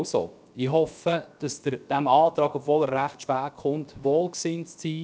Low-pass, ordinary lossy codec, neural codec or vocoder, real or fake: none; none; codec, 16 kHz, 0.7 kbps, FocalCodec; fake